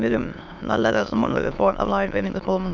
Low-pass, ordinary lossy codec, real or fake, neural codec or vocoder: 7.2 kHz; MP3, 64 kbps; fake; autoencoder, 22.05 kHz, a latent of 192 numbers a frame, VITS, trained on many speakers